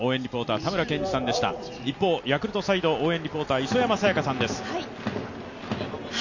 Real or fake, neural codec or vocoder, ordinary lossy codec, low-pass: real; none; none; 7.2 kHz